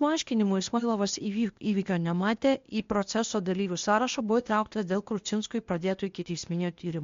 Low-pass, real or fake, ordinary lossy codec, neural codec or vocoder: 7.2 kHz; fake; MP3, 48 kbps; codec, 16 kHz, 0.8 kbps, ZipCodec